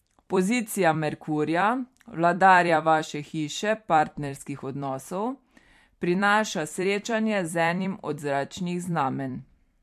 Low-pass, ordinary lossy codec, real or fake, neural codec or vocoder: 14.4 kHz; MP3, 64 kbps; fake; vocoder, 44.1 kHz, 128 mel bands every 256 samples, BigVGAN v2